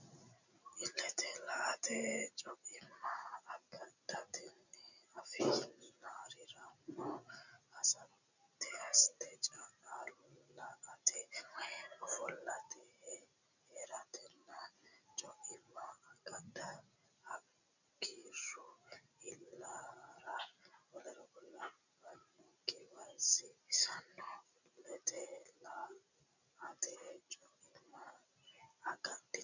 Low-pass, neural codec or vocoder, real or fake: 7.2 kHz; none; real